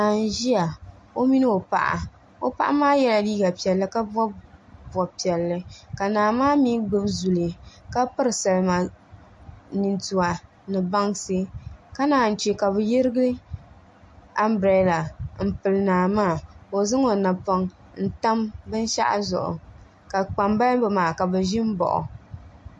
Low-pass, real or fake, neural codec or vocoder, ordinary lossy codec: 10.8 kHz; real; none; MP3, 48 kbps